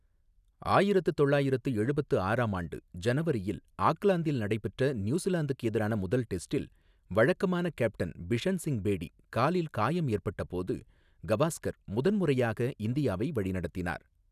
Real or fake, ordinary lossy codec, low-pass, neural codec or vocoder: real; none; 14.4 kHz; none